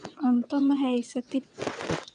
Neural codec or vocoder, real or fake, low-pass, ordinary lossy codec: vocoder, 22.05 kHz, 80 mel bands, WaveNeXt; fake; 9.9 kHz; none